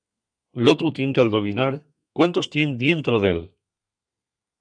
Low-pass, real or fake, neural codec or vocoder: 9.9 kHz; fake; codec, 32 kHz, 1.9 kbps, SNAC